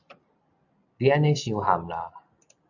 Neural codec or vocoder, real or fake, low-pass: none; real; 7.2 kHz